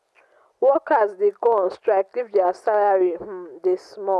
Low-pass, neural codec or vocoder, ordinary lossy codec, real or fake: none; none; none; real